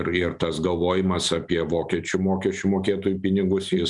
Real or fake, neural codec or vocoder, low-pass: real; none; 10.8 kHz